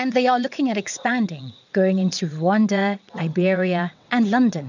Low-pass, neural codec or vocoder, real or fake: 7.2 kHz; vocoder, 22.05 kHz, 80 mel bands, WaveNeXt; fake